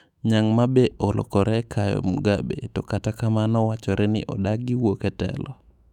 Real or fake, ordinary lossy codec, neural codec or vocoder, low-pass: fake; none; autoencoder, 48 kHz, 128 numbers a frame, DAC-VAE, trained on Japanese speech; 19.8 kHz